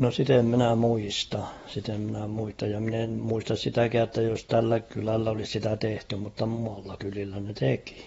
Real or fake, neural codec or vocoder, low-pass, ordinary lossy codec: fake; vocoder, 48 kHz, 128 mel bands, Vocos; 19.8 kHz; AAC, 24 kbps